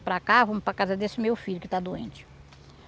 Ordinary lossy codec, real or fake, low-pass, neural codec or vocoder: none; real; none; none